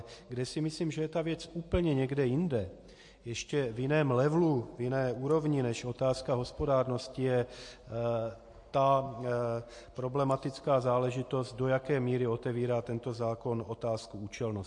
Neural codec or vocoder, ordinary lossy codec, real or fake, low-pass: none; MP3, 48 kbps; real; 10.8 kHz